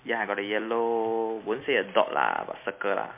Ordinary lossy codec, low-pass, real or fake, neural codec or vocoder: MP3, 24 kbps; 3.6 kHz; real; none